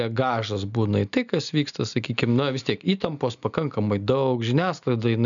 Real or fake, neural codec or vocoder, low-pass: real; none; 7.2 kHz